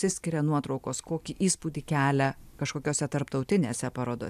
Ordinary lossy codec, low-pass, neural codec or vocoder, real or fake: AAC, 96 kbps; 14.4 kHz; none; real